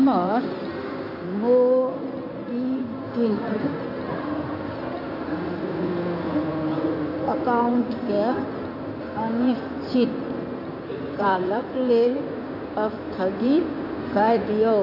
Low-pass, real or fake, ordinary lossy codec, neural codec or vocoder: 5.4 kHz; fake; none; codec, 16 kHz in and 24 kHz out, 2.2 kbps, FireRedTTS-2 codec